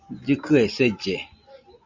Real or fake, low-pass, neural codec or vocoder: real; 7.2 kHz; none